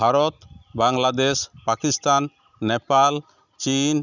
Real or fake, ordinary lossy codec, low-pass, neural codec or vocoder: real; none; 7.2 kHz; none